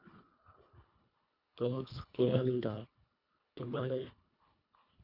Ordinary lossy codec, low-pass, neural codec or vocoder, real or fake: none; 5.4 kHz; codec, 24 kHz, 1.5 kbps, HILCodec; fake